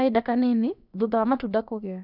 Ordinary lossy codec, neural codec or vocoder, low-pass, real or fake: none; codec, 16 kHz, about 1 kbps, DyCAST, with the encoder's durations; 5.4 kHz; fake